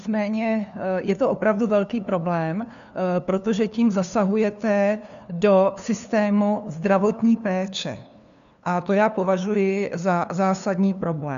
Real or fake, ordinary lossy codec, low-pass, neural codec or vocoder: fake; MP3, 96 kbps; 7.2 kHz; codec, 16 kHz, 2 kbps, FunCodec, trained on LibriTTS, 25 frames a second